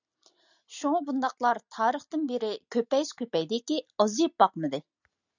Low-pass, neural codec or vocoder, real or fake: 7.2 kHz; none; real